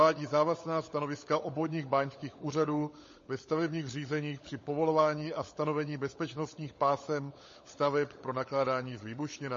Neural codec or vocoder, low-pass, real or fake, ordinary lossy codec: codec, 16 kHz, 16 kbps, FunCodec, trained on LibriTTS, 50 frames a second; 7.2 kHz; fake; MP3, 32 kbps